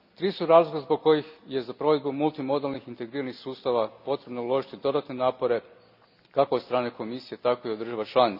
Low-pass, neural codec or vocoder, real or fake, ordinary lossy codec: 5.4 kHz; none; real; none